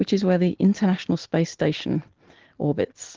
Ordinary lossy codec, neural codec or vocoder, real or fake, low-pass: Opus, 16 kbps; none; real; 7.2 kHz